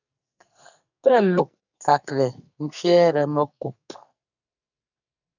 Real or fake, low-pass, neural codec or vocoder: fake; 7.2 kHz; codec, 44.1 kHz, 2.6 kbps, SNAC